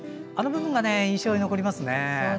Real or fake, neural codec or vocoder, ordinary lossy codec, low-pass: real; none; none; none